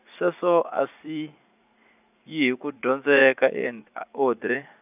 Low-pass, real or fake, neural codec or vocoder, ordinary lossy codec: 3.6 kHz; fake; vocoder, 44.1 kHz, 80 mel bands, Vocos; none